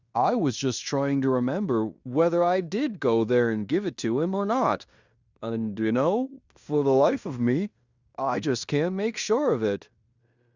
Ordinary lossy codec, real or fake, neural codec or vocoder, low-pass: Opus, 64 kbps; fake; codec, 16 kHz in and 24 kHz out, 0.9 kbps, LongCat-Audio-Codec, fine tuned four codebook decoder; 7.2 kHz